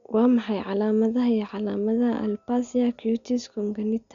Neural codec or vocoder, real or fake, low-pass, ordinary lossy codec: none; real; 7.2 kHz; none